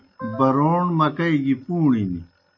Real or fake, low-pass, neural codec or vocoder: real; 7.2 kHz; none